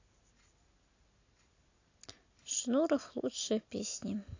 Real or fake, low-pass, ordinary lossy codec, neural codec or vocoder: real; 7.2 kHz; AAC, 48 kbps; none